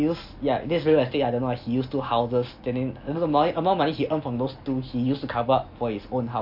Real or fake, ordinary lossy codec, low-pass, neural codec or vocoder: real; MP3, 32 kbps; 5.4 kHz; none